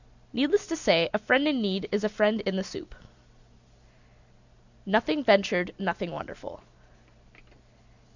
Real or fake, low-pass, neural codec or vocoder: real; 7.2 kHz; none